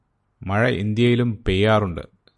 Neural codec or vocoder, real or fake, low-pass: none; real; 10.8 kHz